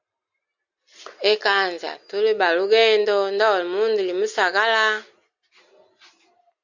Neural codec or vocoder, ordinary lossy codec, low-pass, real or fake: none; Opus, 64 kbps; 7.2 kHz; real